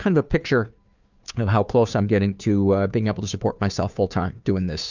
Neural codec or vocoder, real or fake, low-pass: codec, 16 kHz, 2 kbps, FunCodec, trained on Chinese and English, 25 frames a second; fake; 7.2 kHz